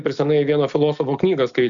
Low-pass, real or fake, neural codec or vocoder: 7.2 kHz; real; none